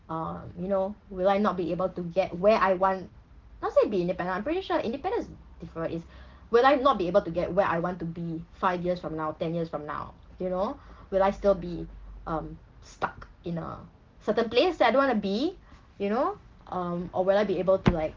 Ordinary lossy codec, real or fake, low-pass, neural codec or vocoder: Opus, 16 kbps; real; 7.2 kHz; none